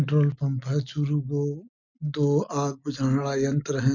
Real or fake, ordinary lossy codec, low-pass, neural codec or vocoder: real; none; 7.2 kHz; none